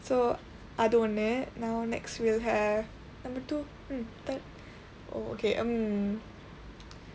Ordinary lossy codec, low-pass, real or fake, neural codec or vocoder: none; none; real; none